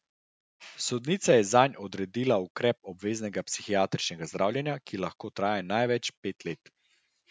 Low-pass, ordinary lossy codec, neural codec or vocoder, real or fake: none; none; none; real